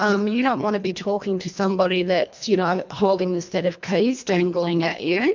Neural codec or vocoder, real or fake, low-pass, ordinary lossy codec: codec, 24 kHz, 1.5 kbps, HILCodec; fake; 7.2 kHz; MP3, 64 kbps